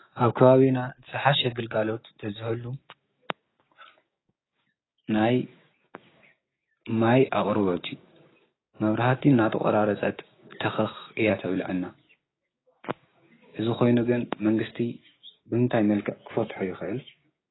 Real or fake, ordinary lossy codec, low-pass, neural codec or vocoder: fake; AAC, 16 kbps; 7.2 kHz; codec, 16 kHz, 6 kbps, DAC